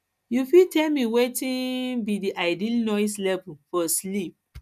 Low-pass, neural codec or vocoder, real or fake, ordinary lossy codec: 14.4 kHz; none; real; none